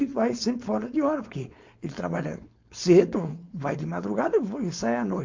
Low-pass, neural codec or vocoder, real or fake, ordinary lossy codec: 7.2 kHz; codec, 16 kHz, 4.8 kbps, FACodec; fake; MP3, 48 kbps